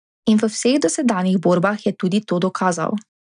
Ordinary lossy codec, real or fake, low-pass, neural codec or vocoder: none; real; 9.9 kHz; none